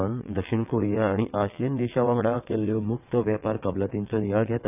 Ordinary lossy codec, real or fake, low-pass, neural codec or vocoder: none; fake; 3.6 kHz; vocoder, 22.05 kHz, 80 mel bands, WaveNeXt